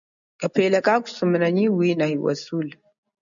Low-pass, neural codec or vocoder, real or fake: 7.2 kHz; none; real